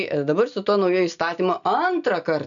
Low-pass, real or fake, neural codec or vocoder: 7.2 kHz; real; none